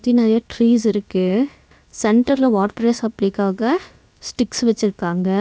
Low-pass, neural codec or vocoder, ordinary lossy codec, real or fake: none; codec, 16 kHz, about 1 kbps, DyCAST, with the encoder's durations; none; fake